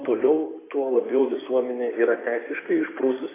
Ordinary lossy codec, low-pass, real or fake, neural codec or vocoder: AAC, 16 kbps; 3.6 kHz; fake; codec, 16 kHz in and 24 kHz out, 2.2 kbps, FireRedTTS-2 codec